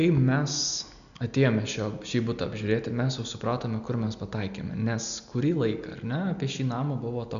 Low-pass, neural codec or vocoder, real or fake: 7.2 kHz; none; real